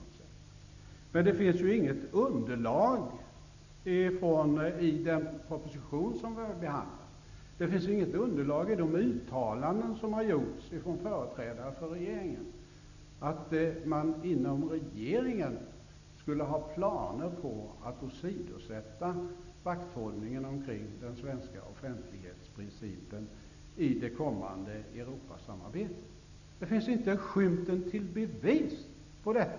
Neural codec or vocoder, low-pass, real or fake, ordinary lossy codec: none; 7.2 kHz; real; none